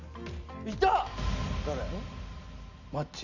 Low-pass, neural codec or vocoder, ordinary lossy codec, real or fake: 7.2 kHz; none; none; real